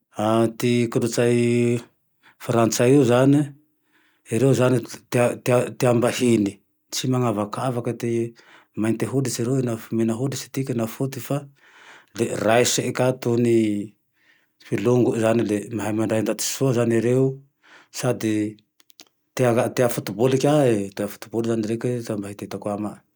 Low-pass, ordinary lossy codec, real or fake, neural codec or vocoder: none; none; real; none